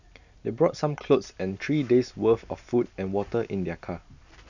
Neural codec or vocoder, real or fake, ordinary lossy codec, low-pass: none; real; none; 7.2 kHz